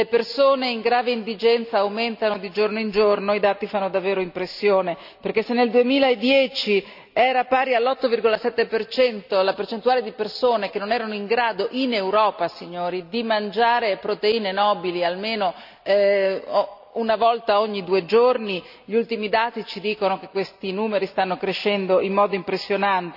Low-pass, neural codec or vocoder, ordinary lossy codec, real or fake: 5.4 kHz; none; none; real